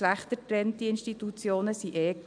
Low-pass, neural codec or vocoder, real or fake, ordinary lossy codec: 9.9 kHz; none; real; none